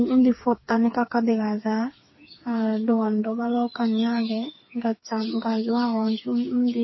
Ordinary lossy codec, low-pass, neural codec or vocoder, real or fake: MP3, 24 kbps; 7.2 kHz; codec, 16 kHz, 4 kbps, FreqCodec, smaller model; fake